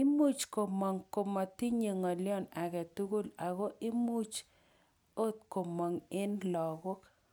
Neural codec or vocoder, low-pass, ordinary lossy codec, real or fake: none; none; none; real